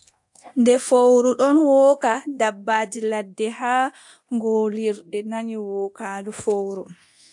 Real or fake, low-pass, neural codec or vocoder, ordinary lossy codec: fake; 10.8 kHz; codec, 24 kHz, 0.9 kbps, DualCodec; AAC, 64 kbps